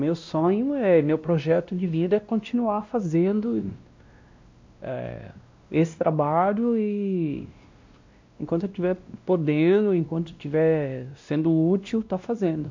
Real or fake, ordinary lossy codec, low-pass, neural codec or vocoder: fake; MP3, 64 kbps; 7.2 kHz; codec, 16 kHz, 1 kbps, X-Codec, WavLM features, trained on Multilingual LibriSpeech